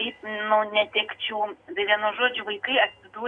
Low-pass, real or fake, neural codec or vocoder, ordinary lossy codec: 9.9 kHz; real; none; Opus, 64 kbps